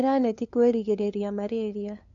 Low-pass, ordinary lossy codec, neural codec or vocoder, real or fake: 7.2 kHz; none; codec, 16 kHz, 2 kbps, FunCodec, trained on LibriTTS, 25 frames a second; fake